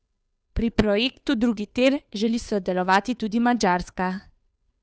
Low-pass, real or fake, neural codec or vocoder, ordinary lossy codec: none; fake; codec, 16 kHz, 2 kbps, FunCodec, trained on Chinese and English, 25 frames a second; none